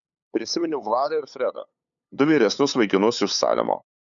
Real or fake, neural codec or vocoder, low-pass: fake; codec, 16 kHz, 8 kbps, FunCodec, trained on LibriTTS, 25 frames a second; 7.2 kHz